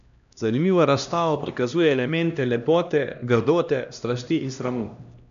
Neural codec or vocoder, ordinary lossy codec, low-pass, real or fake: codec, 16 kHz, 1 kbps, X-Codec, HuBERT features, trained on LibriSpeech; MP3, 96 kbps; 7.2 kHz; fake